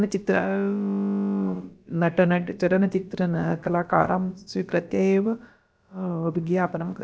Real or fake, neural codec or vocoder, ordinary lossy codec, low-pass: fake; codec, 16 kHz, about 1 kbps, DyCAST, with the encoder's durations; none; none